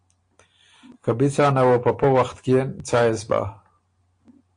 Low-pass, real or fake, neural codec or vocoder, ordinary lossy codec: 9.9 kHz; real; none; AAC, 48 kbps